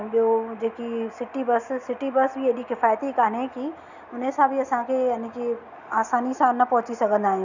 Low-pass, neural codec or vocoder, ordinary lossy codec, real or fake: 7.2 kHz; none; none; real